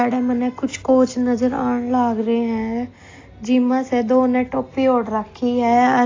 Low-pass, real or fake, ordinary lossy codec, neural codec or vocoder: 7.2 kHz; real; AAC, 32 kbps; none